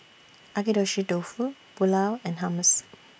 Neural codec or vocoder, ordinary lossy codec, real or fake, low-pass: none; none; real; none